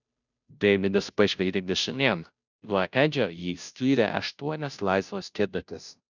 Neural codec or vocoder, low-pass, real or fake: codec, 16 kHz, 0.5 kbps, FunCodec, trained on Chinese and English, 25 frames a second; 7.2 kHz; fake